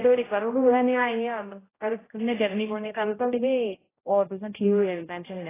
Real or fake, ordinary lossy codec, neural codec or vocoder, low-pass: fake; AAC, 16 kbps; codec, 16 kHz, 0.5 kbps, X-Codec, HuBERT features, trained on general audio; 3.6 kHz